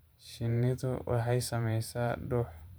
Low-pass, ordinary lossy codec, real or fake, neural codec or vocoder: none; none; fake; vocoder, 44.1 kHz, 128 mel bands every 512 samples, BigVGAN v2